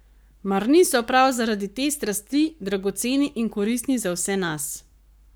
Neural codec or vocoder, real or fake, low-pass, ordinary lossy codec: codec, 44.1 kHz, 7.8 kbps, Pupu-Codec; fake; none; none